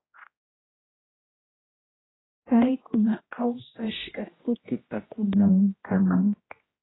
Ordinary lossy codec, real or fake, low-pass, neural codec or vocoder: AAC, 16 kbps; fake; 7.2 kHz; codec, 16 kHz, 1 kbps, X-Codec, HuBERT features, trained on general audio